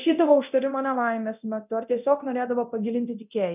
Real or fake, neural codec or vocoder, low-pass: fake; codec, 24 kHz, 0.9 kbps, DualCodec; 3.6 kHz